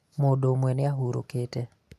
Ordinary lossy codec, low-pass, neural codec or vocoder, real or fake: none; 14.4 kHz; none; real